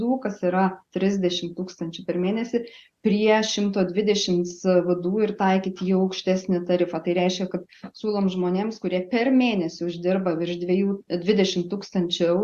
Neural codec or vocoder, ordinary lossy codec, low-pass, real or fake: none; Opus, 64 kbps; 14.4 kHz; real